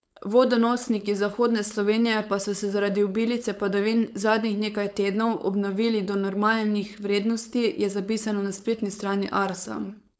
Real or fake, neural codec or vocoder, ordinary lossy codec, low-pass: fake; codec, 16 kHz, 4.8 kbps, FACodec; none; none